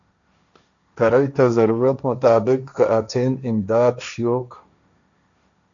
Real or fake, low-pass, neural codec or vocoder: fake; 7.2 kHz; codec, 16 kHz, 1.1 kbps, Voila-Tokenizer